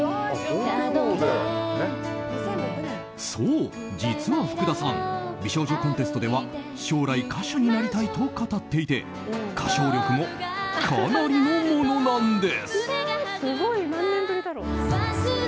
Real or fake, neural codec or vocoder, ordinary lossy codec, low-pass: real; none; none; none